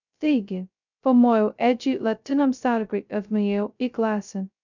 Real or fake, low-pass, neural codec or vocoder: fake; 7.2 kHz; codec, 16 kHz, 0.2 kbps, FocalCodec